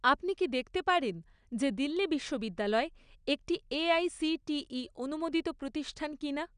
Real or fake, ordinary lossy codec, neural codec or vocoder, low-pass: real; none; none; 9.9 kHz